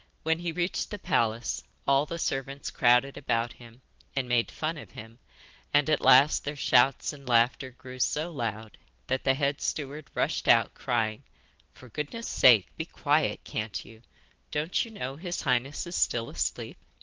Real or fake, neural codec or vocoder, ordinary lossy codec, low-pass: real; none; Opus, 16 kbps; 7.2 kHz